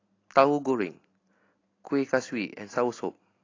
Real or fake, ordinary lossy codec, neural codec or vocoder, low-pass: real; AAC, 32 kbps; none; 7.2 kHz